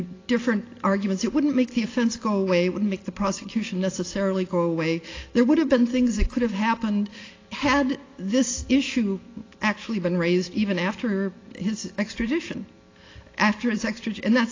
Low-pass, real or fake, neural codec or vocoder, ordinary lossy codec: 7.2 kHz; real; none; AAC, 32 kbps